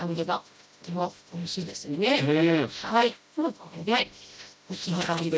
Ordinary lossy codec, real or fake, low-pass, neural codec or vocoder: none; fake; none; codec, 16 kHz, 0.5 kbps, FreqCodec, smaller model